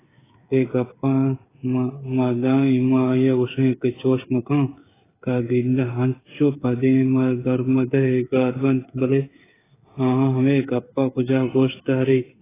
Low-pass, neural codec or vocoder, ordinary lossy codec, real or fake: 3.6 kHz; codec, 16 kHz, 8 kbps, FreqCodec, smaller model; AAC, 16 kbps; fake